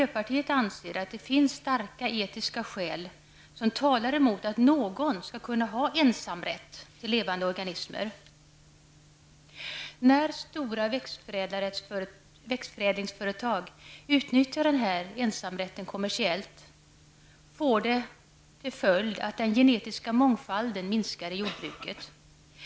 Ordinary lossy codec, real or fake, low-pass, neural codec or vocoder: none; real; none; none